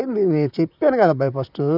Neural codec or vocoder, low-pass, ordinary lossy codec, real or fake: vocoder, 44.1 kHz, 128 mel bands, Pupu-Vocoder; 5.4 kHz; none; fake